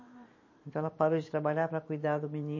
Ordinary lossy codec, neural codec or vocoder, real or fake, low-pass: MP3, 32 kbps; vocoder, 44.1 kHz, 128 mel bands every 512 samples, BigVGAN v2; fake; 7.2 kHz